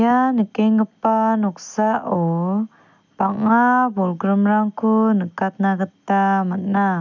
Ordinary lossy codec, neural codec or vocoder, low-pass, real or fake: none; none; 7.2 kHz; real